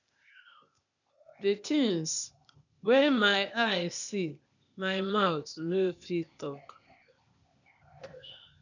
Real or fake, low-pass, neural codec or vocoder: fake; 7.2 kHz; codec, 16 kHz, 0.8 kbps, ZipCodec